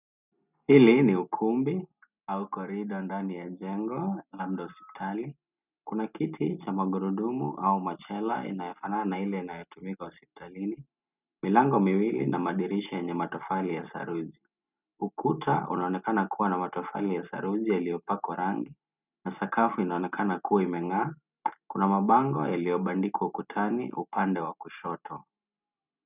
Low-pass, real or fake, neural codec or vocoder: 3.6 kHz; real; none